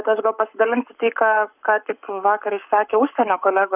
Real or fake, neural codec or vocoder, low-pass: fake; codec, 44.1 kHz, 7.8 kbps, Pupu-Codec; 3.6 kHz